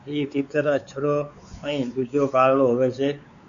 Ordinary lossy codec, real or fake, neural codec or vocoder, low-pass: MP3, 96 kbps; fake; codec, 16 kHz, 4 kbps, X-Codec, WavLM features, trained on Multilingual LibriSpeech; 7.2 kHz